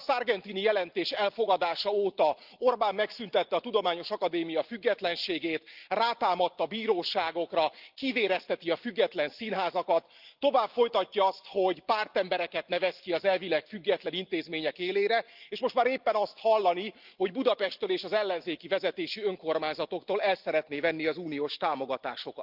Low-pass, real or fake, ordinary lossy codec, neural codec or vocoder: 5.4 kHz; real; Opus, 24 kbps; none